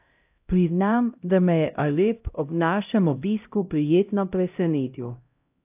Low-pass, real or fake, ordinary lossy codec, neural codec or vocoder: 3.6 kHz; fake; AAC, 32 kbps; codec, 16 kHz, 0.5 kbps, X-Codec, HuBERT features, trained on LibriSpeech